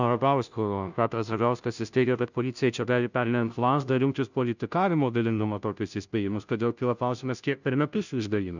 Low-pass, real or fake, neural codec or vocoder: 7.2 kHz; fake; codec, 16 kHz, 0.5 kbps, FunCodec, trained on Chinese and English, 25 frames a second